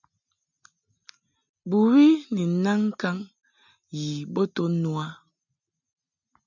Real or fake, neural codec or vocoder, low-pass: real; none; 7.2 kHz